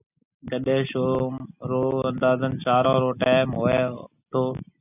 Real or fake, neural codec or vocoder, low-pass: real; none; 3.6 kHz